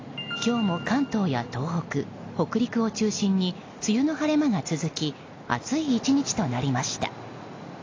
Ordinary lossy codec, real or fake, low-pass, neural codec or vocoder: AAC, 32 kbps; real; 7.2 kHz; none